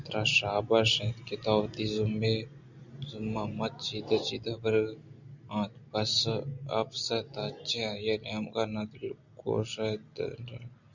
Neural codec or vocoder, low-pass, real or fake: none; 7.2 kHz; real